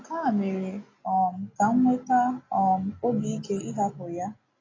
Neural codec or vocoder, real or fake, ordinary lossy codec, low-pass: none; real; AAC, 32 kbps; 7.2 kHz